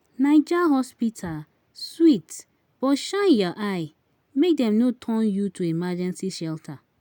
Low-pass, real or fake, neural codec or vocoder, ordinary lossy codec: 19.8 kHz; real; none; none